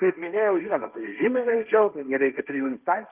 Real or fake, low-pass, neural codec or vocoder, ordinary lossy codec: fake; 3.6 kHz; codec, 16 kHz, 1.1 kbps, Voila-Tokenizer; Opus, 24 kbps